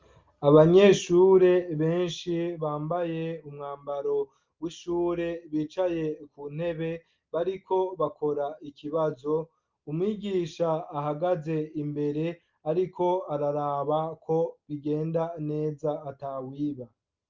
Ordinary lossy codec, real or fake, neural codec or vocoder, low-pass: Opus, 32 kbps; real; none; 7.2 kHz